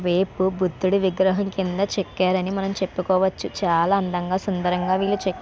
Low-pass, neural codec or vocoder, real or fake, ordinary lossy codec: none; none; real; none